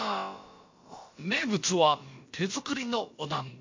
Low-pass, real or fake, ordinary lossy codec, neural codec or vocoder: 7.2 kHz; fake; MP3, 48 kbps; codec, 16 kHz, about 1 kbps, DyCAST, with the encoder's durations